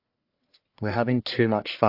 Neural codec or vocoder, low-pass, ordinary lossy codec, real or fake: codec, 44.1 kHz, 1.7 kbps, Pupu-Codec; 5.4 kHz; AAC, 32 kbps; fake